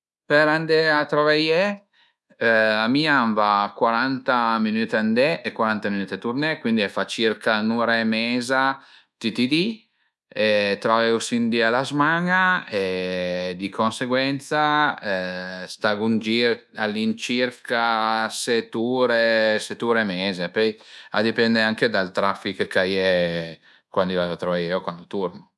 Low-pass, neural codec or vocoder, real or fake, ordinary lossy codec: none; codec, 24 kHz, 1.2 kbps, DualCodec; fake; none